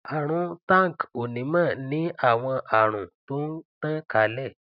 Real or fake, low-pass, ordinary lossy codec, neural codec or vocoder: real; 5.4 kHz; none; none